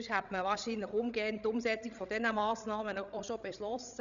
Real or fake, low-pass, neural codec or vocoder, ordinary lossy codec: fake; 7.2 kHz; codec, 16 kHz, 16 kbps, FreqCodec, larger model; none